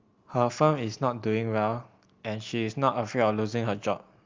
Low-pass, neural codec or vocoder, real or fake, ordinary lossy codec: 7.2 kHz; none; real; Opus, 32 kbps